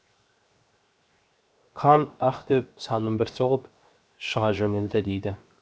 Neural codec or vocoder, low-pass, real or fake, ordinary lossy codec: codec, 16 kHz, 0.7 kbps, FocalCodec; none; fake; none